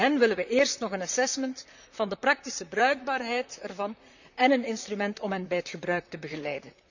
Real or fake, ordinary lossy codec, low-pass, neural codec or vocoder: fake; none; 7.2 kHz; vocoder, 44.1 kHz, 128 mel bands, Pupu-Vocoder